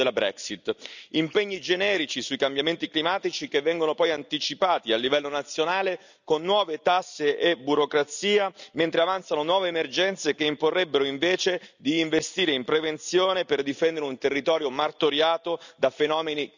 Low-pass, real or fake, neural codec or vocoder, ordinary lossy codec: 7.2 kHz; real; none; none